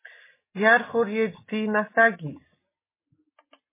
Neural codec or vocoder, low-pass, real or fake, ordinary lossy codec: none; 3.6 kHz; real; MP3, 16 kbps